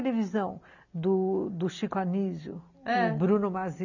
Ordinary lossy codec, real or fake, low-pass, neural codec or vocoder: none; real; 7.2 kHz; none